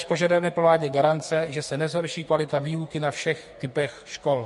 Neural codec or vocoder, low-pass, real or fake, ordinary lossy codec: codec, 44.1 kHz, 2.6 kbps, SNAC; 14.4 kHz; fake; MP3, 48 kbps